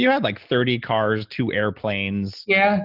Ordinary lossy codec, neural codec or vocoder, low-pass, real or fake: Opus, 32 kbps; none; 5.4 kHz; real